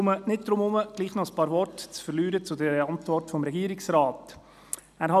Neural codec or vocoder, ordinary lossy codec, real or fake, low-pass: none; none; real; 14.4 kHz